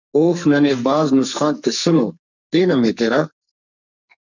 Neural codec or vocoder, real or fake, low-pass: codec, 44.1 kHz, 2.6 kbps, SNAC; fake; 7.2 kHz